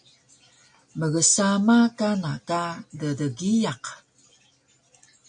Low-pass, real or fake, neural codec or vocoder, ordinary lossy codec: 9.9 kHz; real; none; MP3, 48 kbps